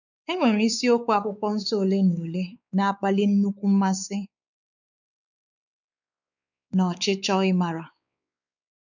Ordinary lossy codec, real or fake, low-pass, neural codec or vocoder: none; fake; 7.2 kHz; codec, 16 kHz, 4 kbps, X-Codec, WavLM features, trained on Multilingual LibriSpeech